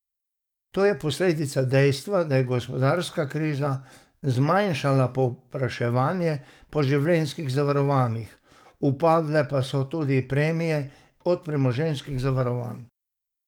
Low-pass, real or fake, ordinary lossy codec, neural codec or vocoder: 19.8 kHz; fake; none; codec, 44.1 kHz, 7.8 kbps, DAC